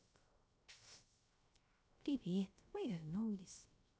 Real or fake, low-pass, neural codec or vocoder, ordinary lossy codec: fake; none; codec, 16 kHz, 0.3 kbps, FocalCodec; none